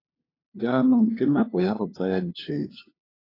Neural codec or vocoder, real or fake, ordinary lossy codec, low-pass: codec, 16 kHz, 2 kbps, FunCodec, trained on LibriTTS, 25 frames a second; fake; AAC, 24 kbps; 5.4 kHz